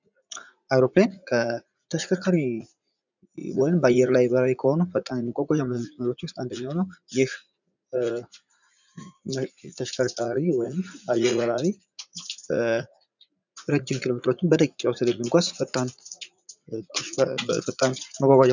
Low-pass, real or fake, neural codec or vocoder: 7.2 kHz; fake; vocoder, 44.1 kHz, 80 mel bands, Vocos